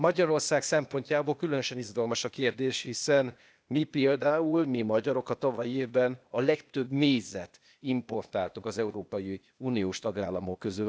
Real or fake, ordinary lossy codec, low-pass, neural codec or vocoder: fake; none; none; codec, 16 kHz, 0.8 kbps, ZipCodec